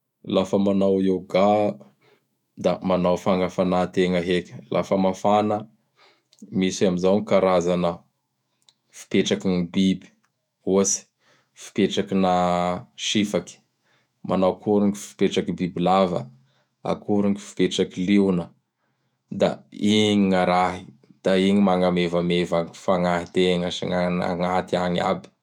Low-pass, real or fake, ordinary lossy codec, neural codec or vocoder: 19.8 kHz; fake; none; autoencoder, 48 kHz, 128 numbers a frame, DAC-VAE, trained on Japanese speech